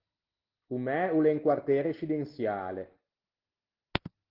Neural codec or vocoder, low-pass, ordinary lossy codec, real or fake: none; 5.4 kHz; Opus, 16 kbps; real